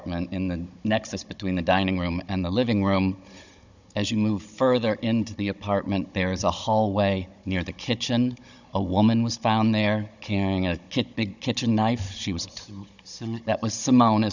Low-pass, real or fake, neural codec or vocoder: 7.2 kHz; fake; codec, 16 kHz, 16 kbps, FunCodec, trained on Chinese and English, 50 frames a second